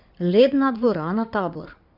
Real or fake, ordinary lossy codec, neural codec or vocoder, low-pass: fake; none; codec, 16 kHz in and 24 kHz out, 2.2 kbps, FireRedTTS-2 codec; 5.4 kHz